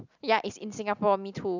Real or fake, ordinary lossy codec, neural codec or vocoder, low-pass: real; none; none; 7.2 kHz